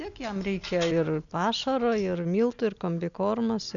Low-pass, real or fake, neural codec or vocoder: 7.2 kHz; real; none